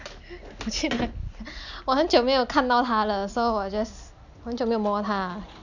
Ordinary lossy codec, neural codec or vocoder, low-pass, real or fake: none; none; 7.2 kHz; real